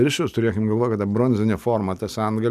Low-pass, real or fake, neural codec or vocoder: 14.4 kHz; real; none